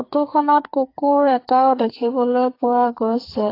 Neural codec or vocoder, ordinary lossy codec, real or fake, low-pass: codec, 44.1 kHz, 2.6 kbps, SNAC; AAC, 32 kbps; fake; 5.4 kHz